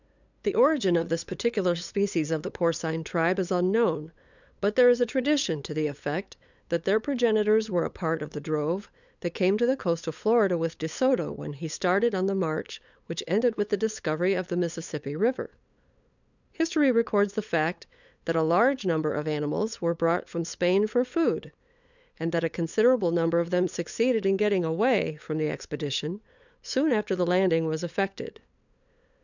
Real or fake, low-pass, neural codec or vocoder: fake; 7.2 kHz; codec, 16 kHz, 8 kbps, FunCodec, trained on LibriTTS, 25 frames a second